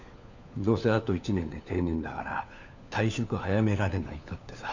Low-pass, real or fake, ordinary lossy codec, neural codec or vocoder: 7.2 kHz; fake; none; codec, 16 kHz, 4 kbps, FunCodec, trained on LibriTTS, 50 frames a second